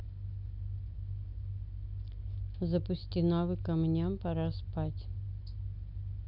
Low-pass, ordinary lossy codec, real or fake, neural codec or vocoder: 5.4 kHz; none; real; none